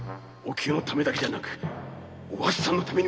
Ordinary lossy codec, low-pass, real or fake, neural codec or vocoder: none; none; real; none